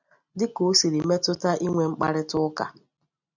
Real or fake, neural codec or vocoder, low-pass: real; none; 7.2 kHz